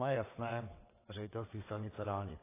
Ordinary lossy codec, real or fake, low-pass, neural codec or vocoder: AAC, 16 kbps; fake; 3.6 kHz; vocoder, 22.05 kHz, 80 mel bands, WaveNeXt